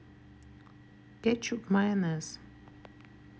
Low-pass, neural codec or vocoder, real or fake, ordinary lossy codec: none; none; real; none